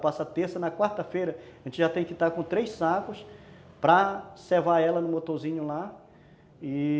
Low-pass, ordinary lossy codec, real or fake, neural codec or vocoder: none; none; real; none